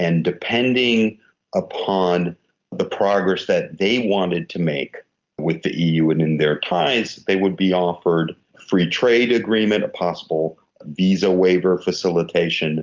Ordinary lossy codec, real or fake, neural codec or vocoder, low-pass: Opus, 24 kbps; real; none; 7.2 kHz